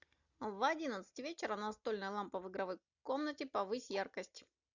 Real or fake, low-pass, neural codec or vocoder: real; 7.2 kHz; none